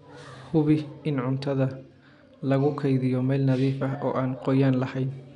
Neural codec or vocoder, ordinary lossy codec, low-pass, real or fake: none; none; 10.8 kHz; real